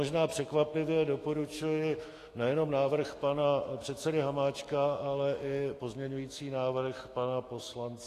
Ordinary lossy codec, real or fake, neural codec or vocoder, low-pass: AAC, 48 kbps; fake; autoencoder, 48 kHz, 128 numbers a frame, DAC-VAE, trained on Japanese speech; 14.4 kHz